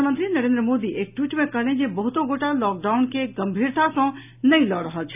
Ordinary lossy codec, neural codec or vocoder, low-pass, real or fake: none; none; 3.6 kHz; real